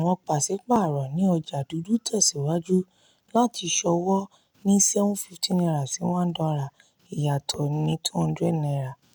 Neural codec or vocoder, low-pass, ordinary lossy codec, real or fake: none; none; none; real